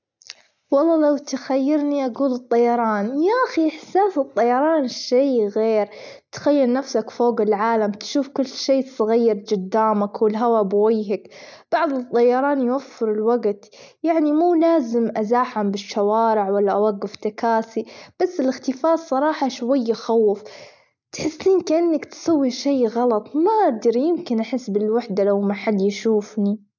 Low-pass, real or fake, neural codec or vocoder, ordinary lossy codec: 7.2 kHz; real; none; none